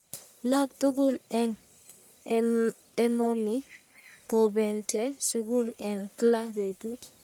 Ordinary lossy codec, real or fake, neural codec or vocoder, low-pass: none; fake; codec, 44.1 kHz, 1.7 kbps, Pupu-Codec; none